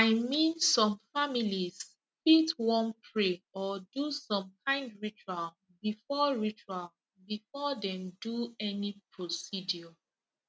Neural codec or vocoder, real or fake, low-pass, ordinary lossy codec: none; real; none; none